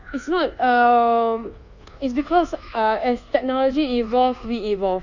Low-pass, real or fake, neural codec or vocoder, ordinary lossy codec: 7.2 kHz; fake; codec, 24 kHz, 1.2 kbps, DualCodec; Opus, 64 kbps